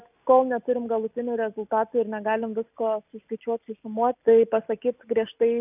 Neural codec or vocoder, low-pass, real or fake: none; 3.6 kHz; real